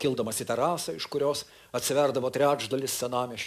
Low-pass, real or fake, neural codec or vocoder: 14.4 kHz; real; none